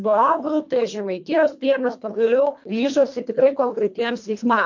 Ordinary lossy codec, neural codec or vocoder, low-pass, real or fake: MP3, 48 kbps; codec, 24 kHz, 1.5 kbps, HILCodec; 7.2 kHz; fake